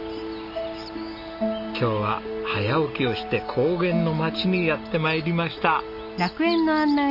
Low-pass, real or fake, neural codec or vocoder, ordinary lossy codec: 5.4 kHz; real; none; none